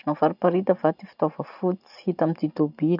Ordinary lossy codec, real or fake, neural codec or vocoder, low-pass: none; real; none; 5.4 kHz